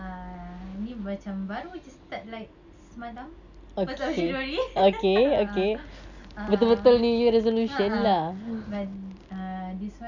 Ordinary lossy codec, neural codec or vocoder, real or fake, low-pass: none; none; real; 7.2 kHz